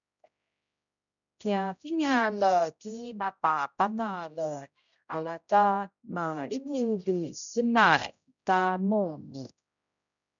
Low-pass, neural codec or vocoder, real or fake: 7.2 kHz; codec, 16 kHz, 0.5 kbps, X-Codec, HuBERT features, trained on general audio; fake